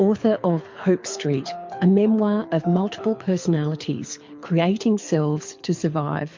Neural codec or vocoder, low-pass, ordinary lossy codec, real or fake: codec, 24 kHz, 6 kbps, HILCodec; 7.2 kHz; MP3, 48 kbps; fake